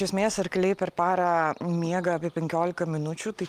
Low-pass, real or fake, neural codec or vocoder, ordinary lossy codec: 14.4 kHz; real; none; Opus, 32 kbps